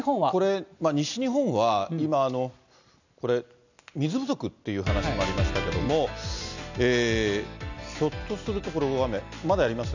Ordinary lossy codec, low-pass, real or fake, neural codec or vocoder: none; 7.2 kHz; real; none